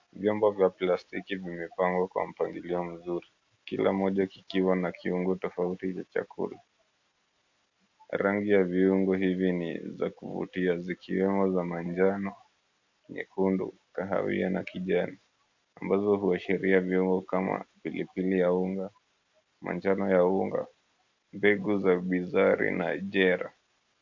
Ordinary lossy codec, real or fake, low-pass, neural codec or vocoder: MP3, 48 kbps; real; 7.2 kHz; none